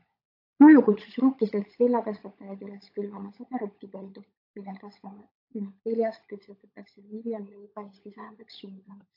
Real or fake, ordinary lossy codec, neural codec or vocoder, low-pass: fake; AAC, 32 kbps; codec, 16 kHz, 16 kbps, FunCodec, trained on LibriTTS, 50 frames a second; 5.4 kHz